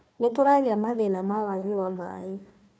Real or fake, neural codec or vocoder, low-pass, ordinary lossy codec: fake; codec, 16 kHz, 1 kbps, FunCodec, trained on Chinese and English, 50 frames a second; none; none